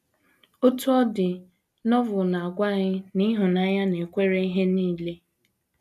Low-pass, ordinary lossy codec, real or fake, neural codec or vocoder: 14.4 kHz; none; real; none